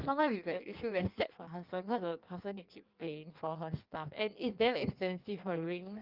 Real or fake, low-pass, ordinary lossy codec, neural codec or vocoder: fake; 5.4 kHz; Opus, 24 kbps; codec, 16 kHz in and 24 kHz out, 1.1 kbps, FireRedTTS-2 codec